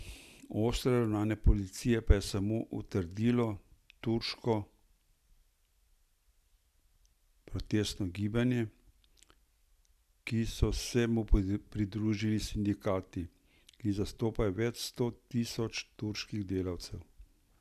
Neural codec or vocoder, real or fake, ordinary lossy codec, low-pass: none; real; none; 14.4 kHz